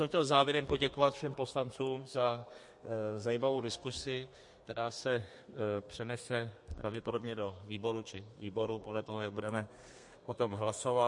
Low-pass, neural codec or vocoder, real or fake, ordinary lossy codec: 10.8 kHz; codec, 32 kHz, 1.9 kbps, SNAC; fake; MP3, 48 kbps